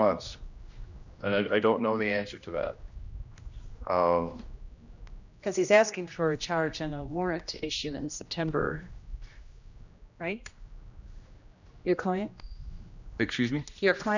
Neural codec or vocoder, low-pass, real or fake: codec, 16 kHz, 1 kbps, X-Codec, HuBERT features, trained on general audio; 7.2 kHz; fake